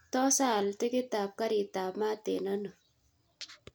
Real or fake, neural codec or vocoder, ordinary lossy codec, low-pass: real; none; none; none